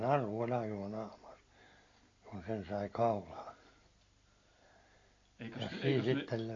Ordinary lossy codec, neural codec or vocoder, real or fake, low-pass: AAC, 32 kbps; none; real; 7.2 kHz